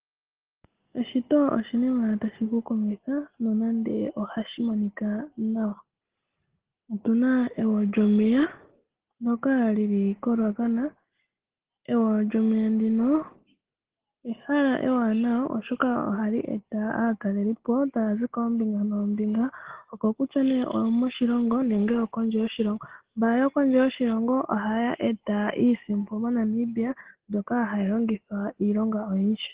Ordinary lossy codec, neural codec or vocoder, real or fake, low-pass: Opus, 16 kbps; none; real; 3.6 kHz